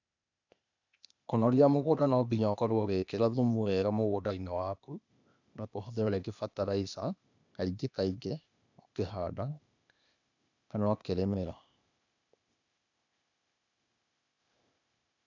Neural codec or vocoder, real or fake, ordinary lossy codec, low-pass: codec, 16 kHz, 0.8 kbps, ZipCodec; fake; none; 7.2 kHz